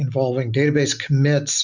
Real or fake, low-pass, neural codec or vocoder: real; 7.2 kHz; none